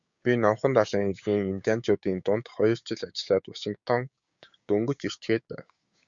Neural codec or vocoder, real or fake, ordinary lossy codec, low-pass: codec, 16 kHz, 6 kbps, DAC; fake; Opus, 64 kbps; 7.2 kHz